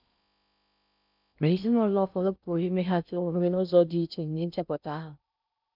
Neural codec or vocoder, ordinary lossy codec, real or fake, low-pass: codec, 16 kHz in and 24 kHz out, 0.6 kbps, FocalCodec, streaming, 4096 codes; none; fake; 5.4 kHz